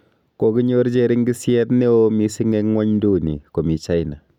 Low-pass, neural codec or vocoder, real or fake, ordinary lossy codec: 19.8 kHz; none; real; none